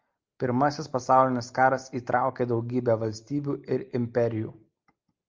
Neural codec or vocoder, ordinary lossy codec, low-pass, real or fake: none; Opus, 24 kbps; 7.2 kHz; real